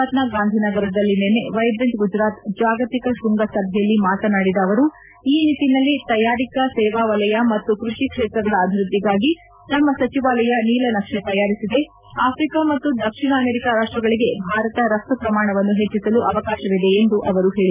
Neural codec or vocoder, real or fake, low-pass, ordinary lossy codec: none; real; 3.6 kHz; none